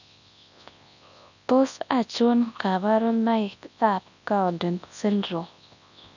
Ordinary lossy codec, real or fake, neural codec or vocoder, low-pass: none; fake; codec, 24 kHz, 0.9 kbps, WavTokenizer, large speech release; 7.2 kHz